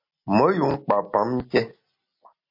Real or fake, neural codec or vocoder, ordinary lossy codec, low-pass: real; none; MP3, 32 kbps; 5.4 kHz